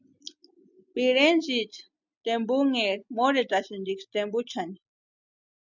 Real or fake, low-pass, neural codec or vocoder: real; 7.2 kHz; none